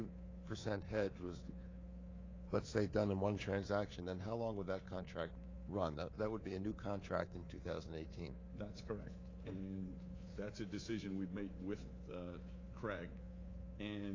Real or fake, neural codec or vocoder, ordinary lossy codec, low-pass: fake; codec, 24 kHz, 3.1 kbps, DualCodec; AAC, 32 kbps; 7.2 kHz